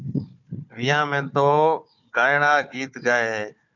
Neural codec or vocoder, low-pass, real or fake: codec, 16 kHz, 4 kbps, FunCodec, trained on Chinese and English, 50 frames a second; 7.2 kHz; fake